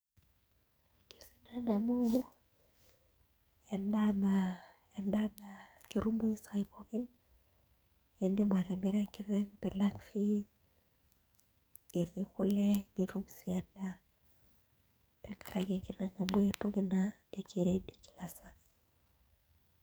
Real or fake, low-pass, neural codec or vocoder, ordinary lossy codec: fake; none; codec, 44.1 kHz, 2.6 kbps, SNAC; none